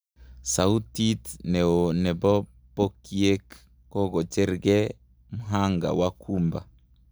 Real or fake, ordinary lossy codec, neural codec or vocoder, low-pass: real; none; none; none